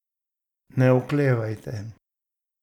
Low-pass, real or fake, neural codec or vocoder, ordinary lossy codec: 19.8 kHz; real; none; none